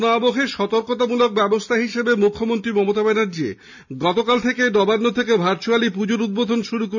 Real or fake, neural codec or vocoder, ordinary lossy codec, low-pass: real; none; none; 7.2 kHz